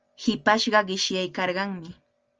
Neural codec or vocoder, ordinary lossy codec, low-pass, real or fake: none; Opus, 24 kbps; 7.2 kHz; real